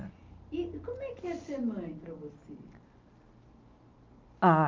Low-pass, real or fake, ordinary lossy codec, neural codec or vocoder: 7.2 kHz; real; Opus, 24 kbps; none